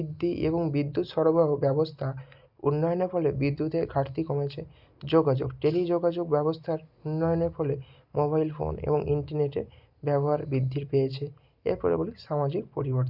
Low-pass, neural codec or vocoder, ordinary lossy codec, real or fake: 5.4 kHz; none; Opus, 64 kbps; real